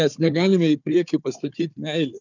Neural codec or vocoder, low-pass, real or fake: codec, 16 kHz, 4 kbps, FunCodec, trained on Chinese and English, 50 frames a second; 7.2 kHz; fake